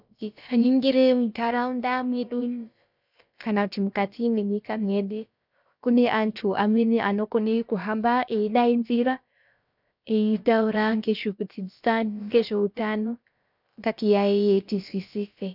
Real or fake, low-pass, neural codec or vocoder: fake; 5.4 kHz; codec, 16 kHz, about 1 kbps, DyCAST, with the encoder's durations